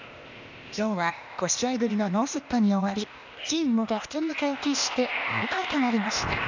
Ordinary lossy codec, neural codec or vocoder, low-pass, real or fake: none; codec, 16 kHz, 0.8 kbps, ZipCodec; 7.2 kHz; fake